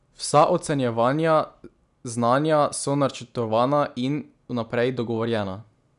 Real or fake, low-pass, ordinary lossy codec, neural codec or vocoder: real; 10.8 kHz; none; none